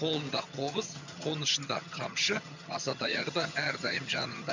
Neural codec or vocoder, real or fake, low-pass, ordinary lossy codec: vocoder, 22.05 kHz, 80 mel bands, HiFi-GAN; fake; 7.2 kHz; MP3, 64 kbps